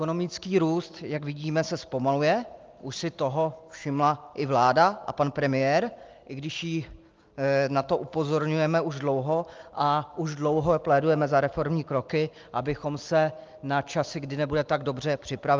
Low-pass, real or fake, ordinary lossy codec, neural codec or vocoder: 7.2 kHz; real; Opus, 24 kbps; none